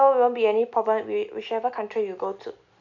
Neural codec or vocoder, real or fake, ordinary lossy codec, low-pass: none; real; none; 7.2 kHz